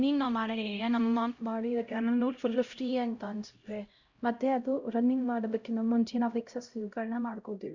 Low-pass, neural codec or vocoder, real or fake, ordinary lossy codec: 7.2 kHz; codec, 16 kHz, 0.5 kbps, X-Codec, HuBERT features, trained on LibriSpeech; fake; Opus, 64 kbps